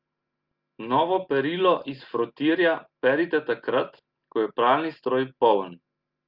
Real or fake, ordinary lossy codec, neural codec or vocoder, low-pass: real; Opus, 32 kbps; none; 5.4 kHz